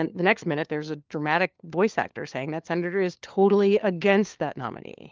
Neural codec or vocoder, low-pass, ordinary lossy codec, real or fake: codec, 16 kHz, 4 kbps, FunCodec, trained on LibriTTS, 50 frames a second; 7.2 kHz; Opus, 32 kbps; fake